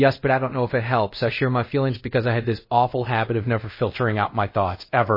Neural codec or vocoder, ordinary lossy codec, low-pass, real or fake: codec, 24 kHz, 0.5 kbps, DualCodec; MP3, 24 kbps; 5.4 kHz; fake